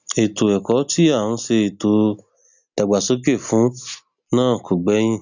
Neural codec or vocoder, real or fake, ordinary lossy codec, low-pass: none; real; none; 7.2 kHz